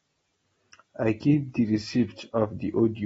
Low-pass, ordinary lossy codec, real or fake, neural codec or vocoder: 19.8 kHz; AAC, 24 kbps; real; none